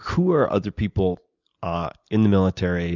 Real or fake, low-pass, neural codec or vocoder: real; 7.2 kHz; none